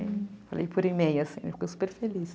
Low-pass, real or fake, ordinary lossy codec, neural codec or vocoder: none; real; none; none